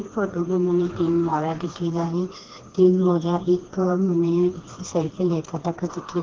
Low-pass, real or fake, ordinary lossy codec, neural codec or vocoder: 7.2 kHz; fake; Opus, 16 kbps; codec, 16 kHz, 2 kbps, FreqCodec, smaller model